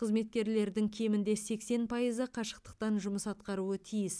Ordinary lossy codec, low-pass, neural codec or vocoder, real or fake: none; none; none; real